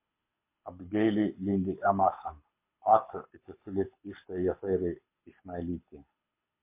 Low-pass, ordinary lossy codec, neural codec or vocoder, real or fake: 3.6 kHz; MP3, 24 kbps; codec, 24 kHz, 6 kbps, HILCodec; fake